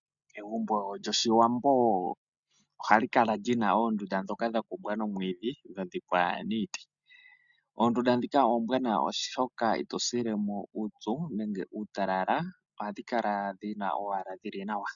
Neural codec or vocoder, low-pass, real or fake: none; 7.2 kHz; real